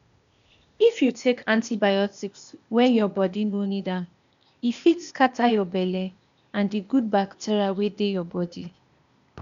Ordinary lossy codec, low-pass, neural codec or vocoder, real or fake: none; 7.2 kHz; codec, 16 kHz, 0.8 kbps, ZipCodec; fake